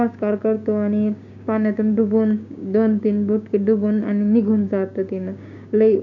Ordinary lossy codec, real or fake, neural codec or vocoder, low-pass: none; fake; codec, 16 kHz, 6 kbps, DAC; 7.2 kHz